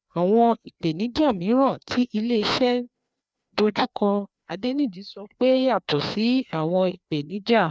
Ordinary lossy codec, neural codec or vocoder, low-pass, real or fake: none; codec, 16 kHz, 2 kbps, FreqCodec, larger model; none; fake